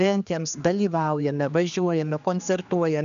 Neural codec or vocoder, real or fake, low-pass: codec, 16 kHz, 2 kbps, X-Codec, HuBERT features, trained on general audio; fake; 7.2 kHz